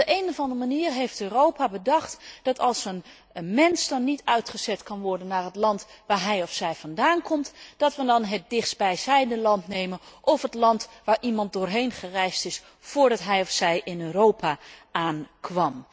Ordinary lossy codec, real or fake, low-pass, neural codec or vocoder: none; real; none; none